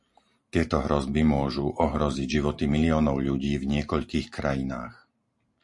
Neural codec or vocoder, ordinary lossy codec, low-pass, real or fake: vocoder, 44.1 kHz, 128 mel bands every 512 samples, BigVGAN v2; MP3, 48 kbps; 10.8 kHz; fake